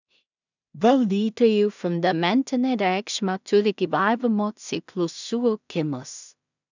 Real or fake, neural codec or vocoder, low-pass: fake; codec, 16 kHz in and 24 kHz out, 0.4 kbps, LongCat-Audio-Codec, two codebook decoder; 7.2 kHz